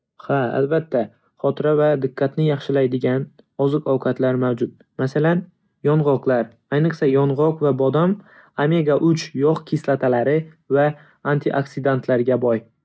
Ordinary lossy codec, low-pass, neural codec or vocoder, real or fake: none; none; none; real